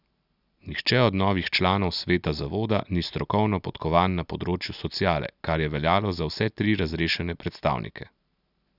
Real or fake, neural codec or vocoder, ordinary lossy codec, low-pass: real; none; none; 5.4 kHz